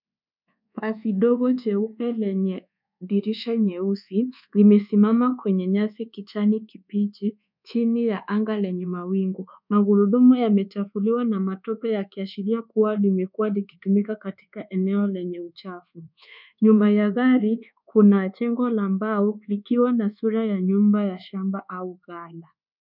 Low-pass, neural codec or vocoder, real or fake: 5.4 kHz; codec, 24 kHz, 1.2 kbps, DualCodec; fake